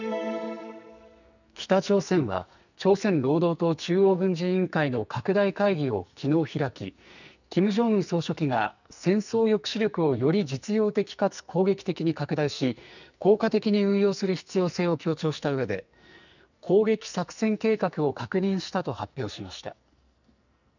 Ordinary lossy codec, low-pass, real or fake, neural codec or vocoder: none; 7.2 kHz; fake; codec, 44.1 kHz, 2.6 kbps, SNAC